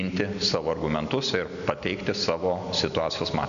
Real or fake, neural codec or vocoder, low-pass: real; none; 7.2 kHz